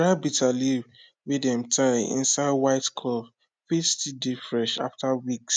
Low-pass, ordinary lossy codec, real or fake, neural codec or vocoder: none; none; real; none